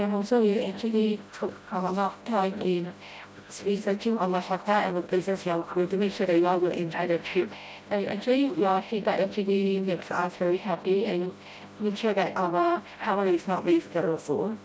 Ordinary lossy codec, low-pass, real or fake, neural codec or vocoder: none; none; fake; codec, 16 kHz, 0.5 kbps, FreqCodec, smaller model